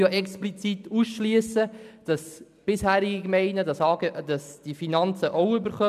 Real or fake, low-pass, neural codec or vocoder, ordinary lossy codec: real; 14.4 kHz; none; none